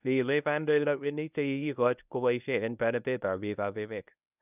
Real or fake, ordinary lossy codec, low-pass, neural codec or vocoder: fake; none; 3.6 kHz; codec, 24 kHz, 0.9 kbps, WavTokenizer, medium speech release version 1